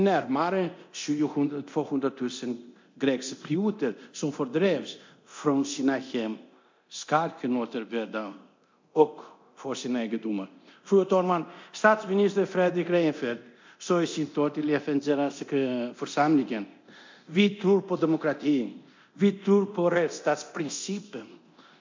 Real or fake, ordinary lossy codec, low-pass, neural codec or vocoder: fake; MP3, 48 kbps; 7.2 kHz; codec, 24 kHz, 0.9 kbps, DualCodec